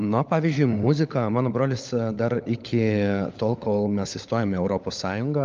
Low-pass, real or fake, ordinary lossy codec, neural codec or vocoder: 7.2 kHz; fake; Opus, 24 kbps; codec, 16 kHz, 16 kbps, FunCodec, trained on LibriTTS, 50 frames a second